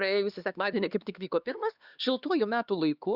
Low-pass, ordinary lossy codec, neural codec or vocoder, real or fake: 5.4 kHz; Opus, 64 kbps; codec, 16 kHz, 2 kbps, X-Codec, HuBERT features, trained on LibriSpeech; fake